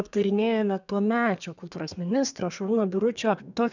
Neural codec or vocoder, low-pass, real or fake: codec, 32 kHz, 1.9 kbps, SNAC; 7.2 kHz; fake